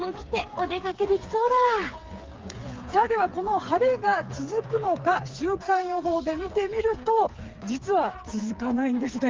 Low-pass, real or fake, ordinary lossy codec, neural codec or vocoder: 7.2 kHz; fake; Opus, 32 kbps; codec, 16 kHz, 4 kbps, FreqCodec, smaller model